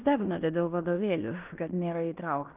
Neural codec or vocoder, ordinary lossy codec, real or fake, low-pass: codec, 16 kHz in and 24 kHz out, 0.9 kbps, LongCat-Audio-Codec, fine tuned four codebook decoder; Opus, 32 kbps; fake; 3.6 kHz